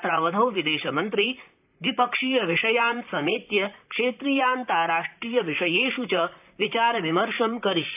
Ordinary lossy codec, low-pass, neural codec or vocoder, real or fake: AAC, 32 kbps; 3.6 kHz; vocoder, 44.1 kHz, 128 mel bands, Pupu-Vocoder; fake